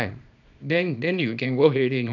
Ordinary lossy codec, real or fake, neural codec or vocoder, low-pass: none; fake; codec, 24 kHz, 0.9 kbps, WavTokenizer, small release; 7.2 kHz